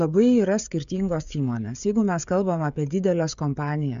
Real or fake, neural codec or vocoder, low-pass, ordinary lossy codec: fake; codec, 16 kHz, 16 kbps, FreqCodec, smaller model; 7.2 kHz; MP3, 64 kbps